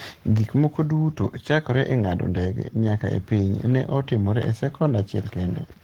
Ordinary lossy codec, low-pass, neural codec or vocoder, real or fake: Opus, 16 kbps; 19.8 kHz; codec, 44.1 kHz, 7.8 kbps, Pupu-Codec; fake